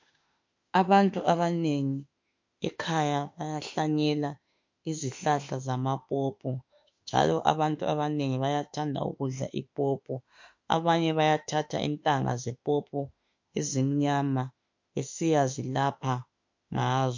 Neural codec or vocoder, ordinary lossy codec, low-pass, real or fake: autoencoder, 48 kHz, 32 numbers a frame, DAC-VAE, trained on Japanese speech; MP3, 48 kbps; 7.2 kHz; fake